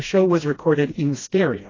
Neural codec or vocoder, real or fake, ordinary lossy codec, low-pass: codec, 16 kHz, 1 kbps, FreqCodec, smaller model; fake; AAC, 32 kbps; 7.2 kHz